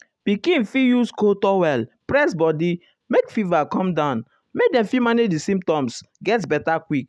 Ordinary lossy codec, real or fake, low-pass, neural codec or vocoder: none; real; none; none